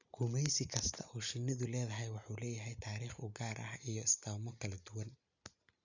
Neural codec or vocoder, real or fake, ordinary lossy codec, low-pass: none; real; none; 7.2 kHz